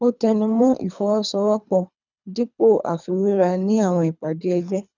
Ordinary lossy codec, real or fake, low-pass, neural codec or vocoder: none; fake; 7.2 kHz; codec, 24 kHz, 3 kbps, HILCodec